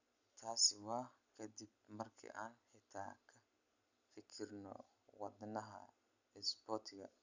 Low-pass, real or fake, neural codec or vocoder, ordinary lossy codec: 7.2 kHz; real; none; none